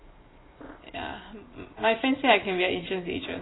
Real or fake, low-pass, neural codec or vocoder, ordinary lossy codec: real; 7.2 kHz; none; AAC, 16 kbps